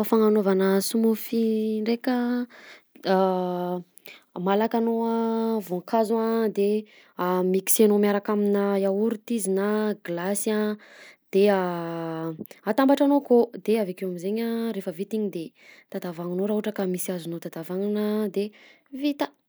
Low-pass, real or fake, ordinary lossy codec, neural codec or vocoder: none; real; none; none